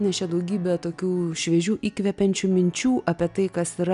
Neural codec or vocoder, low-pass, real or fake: none; 10.8 kHz; real